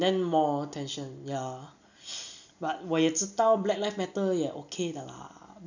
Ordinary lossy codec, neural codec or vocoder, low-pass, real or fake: none; none; 7.2 kHz; real